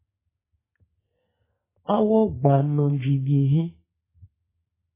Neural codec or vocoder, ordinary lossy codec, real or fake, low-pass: codec, 44.1 kHz, 2.6 kbps, SNAC; MP3, 16 kbps; fake; 3.6 kHz